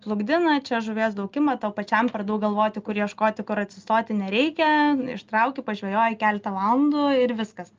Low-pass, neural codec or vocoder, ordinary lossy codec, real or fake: 7.2 kHz; none; Opus, 24 kbps; real